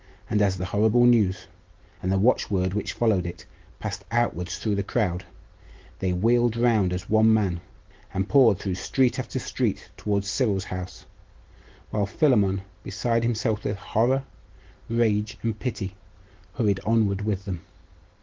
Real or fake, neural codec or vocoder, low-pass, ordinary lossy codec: real; none; 7.2 kHz; Opus, 16 kbps